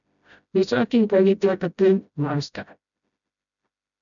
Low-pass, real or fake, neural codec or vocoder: 7.2 kHz; fake; codec, 16 kHz, 0.5 kbps, FreqCodec, smaller model